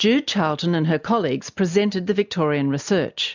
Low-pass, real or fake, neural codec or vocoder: 7.2 kHz; real; none